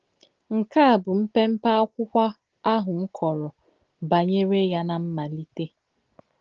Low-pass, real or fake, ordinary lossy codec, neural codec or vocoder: 7.2 kHz; real; Opus, 16 kbps; none